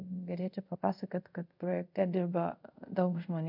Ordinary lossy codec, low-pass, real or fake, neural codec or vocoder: MP3, 48 kbps; 5.4 kHz; fake; codec, 24 kHz, 0.5 kbps, DualCodec